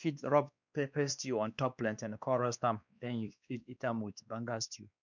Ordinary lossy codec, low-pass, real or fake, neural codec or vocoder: none; 7.2 kHz; fake; codec, 16 kHz, 2 kbps, X-Codec, WavLM features, trained on Multilingual LibriSpeech